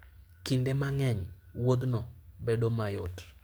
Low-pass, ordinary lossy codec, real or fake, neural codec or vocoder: none; none; fake; codec, 44.1 kHz, 7.8 kbps, DAC